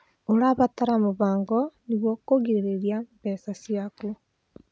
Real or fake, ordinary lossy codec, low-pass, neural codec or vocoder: real; none; none; none